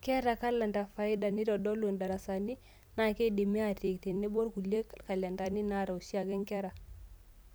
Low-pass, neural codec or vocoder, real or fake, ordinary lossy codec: none; vocoder, 44.1 kHz, 128 mel bands every 256 samples, BigVGAN v2; fake; none